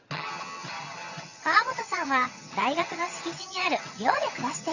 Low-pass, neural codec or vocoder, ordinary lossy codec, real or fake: 7.2 kHz; vocoder, 22.05 kHz, 80 mel bands, HiFi-GAN; none; fake